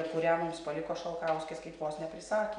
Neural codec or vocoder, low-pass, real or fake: none; 9.9 kHz; real